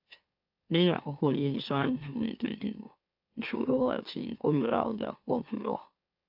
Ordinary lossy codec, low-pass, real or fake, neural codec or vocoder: AAC, 48 kbps; 5.4 kHz; fake; autoencoder, 44.1 kHz, a latent of 192 numbers a frame, MeloTTS